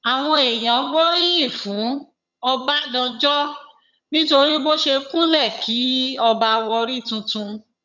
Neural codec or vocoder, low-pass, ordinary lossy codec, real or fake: vocoder, 22.05 kHz, 80 mel bands, HiFi-GAN; 7.2 kHz; none; fake